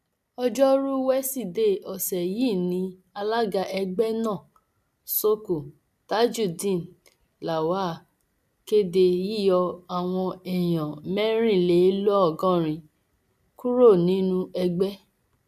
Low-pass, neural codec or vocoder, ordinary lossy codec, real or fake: 14.4 kHz; none; none; real